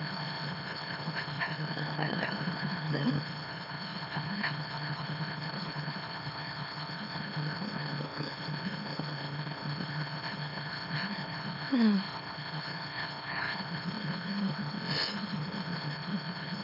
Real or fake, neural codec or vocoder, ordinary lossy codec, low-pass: fake; autoencoder, 44.1 kHz, a latent of 192 numbers a frame, MeloTTS; none; 5.4 kHz